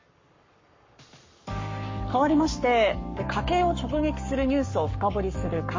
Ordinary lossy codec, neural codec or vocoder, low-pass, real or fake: MP3, 32 kbps; codec, 44.1 kHz, 7.8 kbps, Pupu-Codec; 7.2 kHz; fake